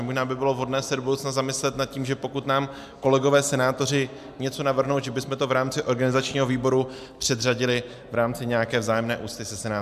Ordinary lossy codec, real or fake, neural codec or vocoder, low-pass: MP3, 96 kbps; real; none; 14.4 kHz